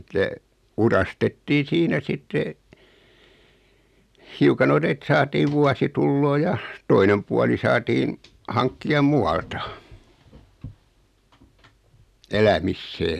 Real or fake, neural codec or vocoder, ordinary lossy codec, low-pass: real; none; none; 14.4 kHz